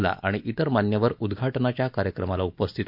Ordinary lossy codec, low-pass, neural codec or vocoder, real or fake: none; 5.4 kHz; none; real